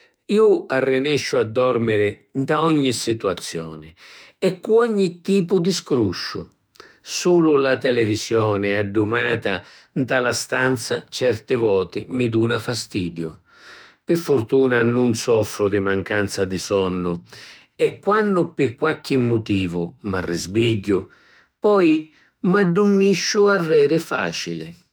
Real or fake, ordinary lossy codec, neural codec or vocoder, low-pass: fake; none; autoencoder, 48 kHz, 32 numbers a frame, DAC-VAE, trained on Japanese speech; none